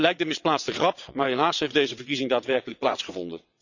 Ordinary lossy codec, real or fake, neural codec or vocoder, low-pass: none; fake; vocoder, 22.05 kHz, 80 mel bands, WaveNeXt; 7.2 kHz